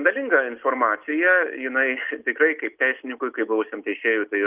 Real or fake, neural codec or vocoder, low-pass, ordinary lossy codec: real; none; 3.6 kHz; Opus, 32 kbps